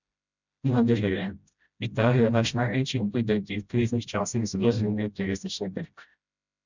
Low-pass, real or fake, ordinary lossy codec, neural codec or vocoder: 7.2 kHz; fake; none; codec, 16 kHz, 0.5 kbps, FreqCodec, smaller model